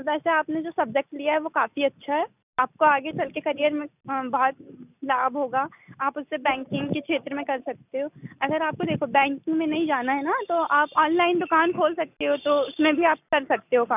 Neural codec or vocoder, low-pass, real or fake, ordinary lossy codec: none; 3.6 kHz; real; none